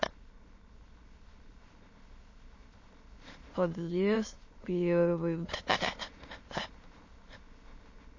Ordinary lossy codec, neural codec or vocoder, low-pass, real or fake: MP3, 32 kbps; autoencoder, 22.05 kHz, a latent of 192 numbers a frame, VITS, trained on many speakers; 7.2 kHz; fake